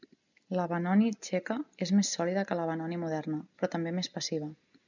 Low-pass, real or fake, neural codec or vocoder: 7.2 kHz; real; none